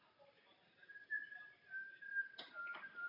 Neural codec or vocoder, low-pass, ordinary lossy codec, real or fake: none; 5.4 kHz; Opus, 64 kbps; real